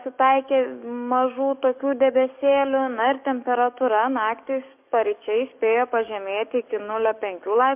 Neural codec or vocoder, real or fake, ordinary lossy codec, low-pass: codec, 44.1 kHz, 7.8 kbps, DAC; fake; MP3, 32 kbps; 3.6 kHz